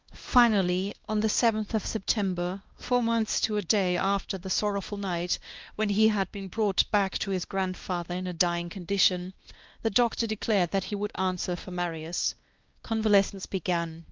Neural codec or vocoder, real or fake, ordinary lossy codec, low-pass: codec, 16 kHz, 1 kbps, X-Codec, WavLM features, trained on Multilingual LibriSpeech; fake; Opus, 32 kbps; 7.2 kHz